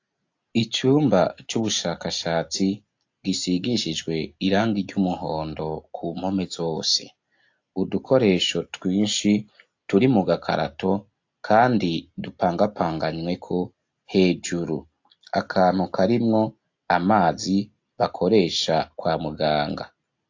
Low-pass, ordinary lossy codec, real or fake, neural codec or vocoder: 7.2 kHz; AAC, 48 kbps; real; none